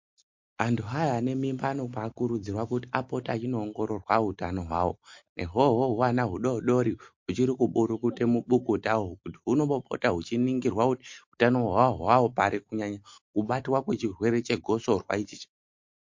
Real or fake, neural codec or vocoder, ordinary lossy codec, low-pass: real; none; MP3, 48 kbps; 7.2 kHz